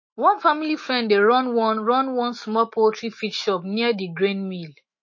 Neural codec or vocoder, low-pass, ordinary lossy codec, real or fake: autoencoder, 48 kHz, 128 numbers a frame, DAC-VAE, trained on Japanese speech; 7.2 kHz; MP3, 32 kbps; fake